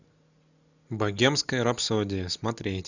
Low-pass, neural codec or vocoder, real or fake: 7.2 kHz; none; real